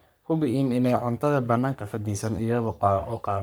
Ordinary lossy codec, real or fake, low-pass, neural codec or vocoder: none; fake; none; codec, 44.1 kHz, 3.4 kbps, Pupu-Codec